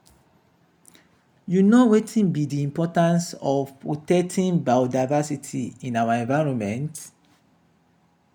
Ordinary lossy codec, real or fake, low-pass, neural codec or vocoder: none; real; 19.8 kHz; none